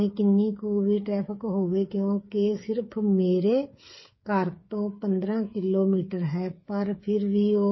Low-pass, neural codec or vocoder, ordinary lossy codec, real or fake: 7.2 kHz; codec, 16 kHz, 8 kbps, FreqCodec, smaller model; MP3, 24 kbps; fake